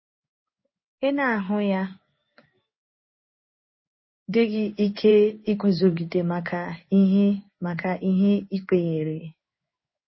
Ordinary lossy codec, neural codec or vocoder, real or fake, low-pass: MP3, 24 kbps; codec, 16 kHz in and 24 kHz out, 1 kbps, XY-Tokenizer; fake; 7.2 kHz